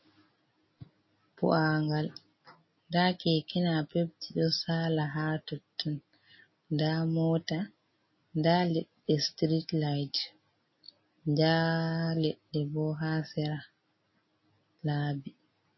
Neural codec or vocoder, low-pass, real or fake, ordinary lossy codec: none; 7.2 kHz; real; MP3, 24 kbps